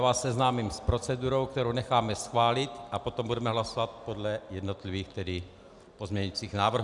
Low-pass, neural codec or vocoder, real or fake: 10.8 kHz; none; real